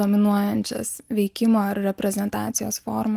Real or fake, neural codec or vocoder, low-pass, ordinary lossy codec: real; none; 14.4 kHz; Opus, 24 kbps